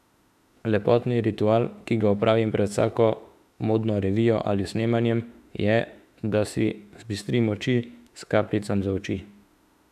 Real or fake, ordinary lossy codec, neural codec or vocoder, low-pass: fake; none; autoencoder, 48 kHz, 32 numbers a frame, DAC-VAE, trained on Japanese speech; 14.4 kHz